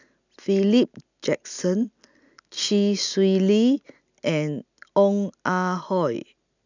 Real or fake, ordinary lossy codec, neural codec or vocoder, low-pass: real; none; none; 7.2 kHz